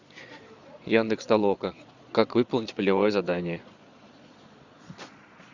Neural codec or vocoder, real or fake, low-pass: vocoder, 22.05 kHz, 80 mel bands, Vocos; fake; 7.2 kHz